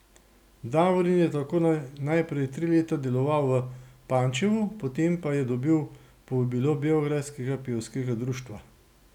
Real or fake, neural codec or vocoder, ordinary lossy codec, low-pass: real; none; none; 19.8 kHz